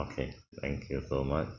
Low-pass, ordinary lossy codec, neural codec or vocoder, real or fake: 7.2 kHz; none; none; real